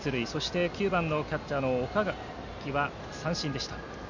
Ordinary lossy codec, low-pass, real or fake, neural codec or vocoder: none; 7.2 kHz; real; none